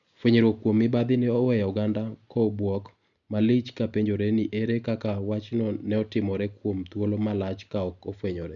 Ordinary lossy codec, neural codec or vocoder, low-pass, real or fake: none; none; 7.2 kHz; real